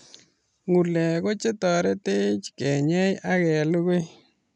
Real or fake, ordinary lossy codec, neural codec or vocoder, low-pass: real; none; none; 10.8 kHz